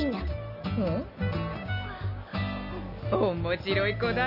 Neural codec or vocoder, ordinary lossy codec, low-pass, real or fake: none; none; 5.4 kHz; real